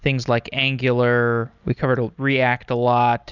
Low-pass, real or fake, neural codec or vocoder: 7.2 kHz; real; none